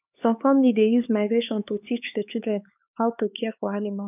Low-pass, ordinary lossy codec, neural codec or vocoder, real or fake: 3.6 kHz; none; codec, 16 kHz, 4 kbps, X-Codec, HuBERT features, trained on LibriSpeech; fake